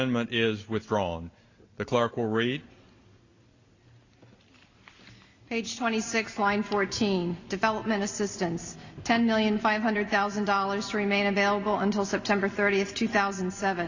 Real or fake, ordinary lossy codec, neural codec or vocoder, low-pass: real; Opus, 64 kbps; none; 7.2 kHz